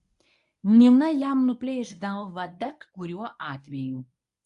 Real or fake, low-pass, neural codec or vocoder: fake; 10.8 kHz; codec, 24 kHz, 0.9 kbps, WavTokenizer, medium speech release version 1